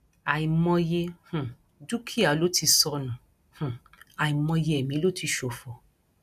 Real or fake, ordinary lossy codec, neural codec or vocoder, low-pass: real; none; none; 14.4 kHz